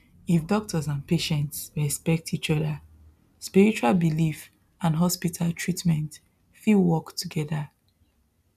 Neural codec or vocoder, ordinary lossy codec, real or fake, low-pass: none; none; real; 14.4 kHz